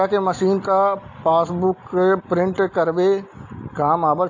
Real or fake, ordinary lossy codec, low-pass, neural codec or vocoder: real; AAC, 48 kbps; 7.2 kHz; none